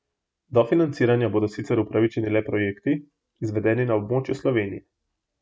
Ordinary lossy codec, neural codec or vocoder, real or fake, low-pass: none; none; real; none